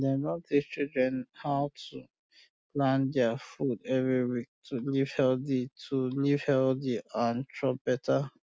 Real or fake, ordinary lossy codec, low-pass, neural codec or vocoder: real; none; none; none